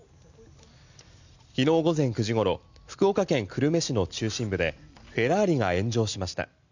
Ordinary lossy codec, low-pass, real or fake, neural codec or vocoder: none; 7.2 kHz; real; none